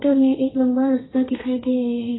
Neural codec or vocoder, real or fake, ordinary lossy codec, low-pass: codec, 32 kHz, 1.9 kbps, SNAC; fake; AAC, 16 kbps; 7.2 kHz